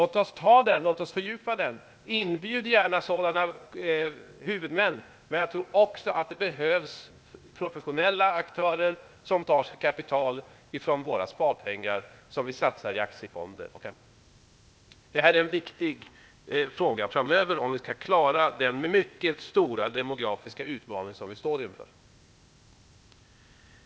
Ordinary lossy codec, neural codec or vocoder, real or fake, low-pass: none; codec, 16 kHz, 0.8 kbps, ZipCodec; fake; none